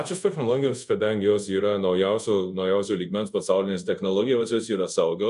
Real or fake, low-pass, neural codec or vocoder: fake; 10.8 kHz; codec, 24 kHz, 0.5 kbps, DualCodec